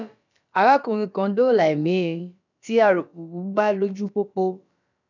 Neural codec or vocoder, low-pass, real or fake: codec, 16 kHz, about 1 kbps, DyCAST, with the encoder's durations; 7.2 kHz; fake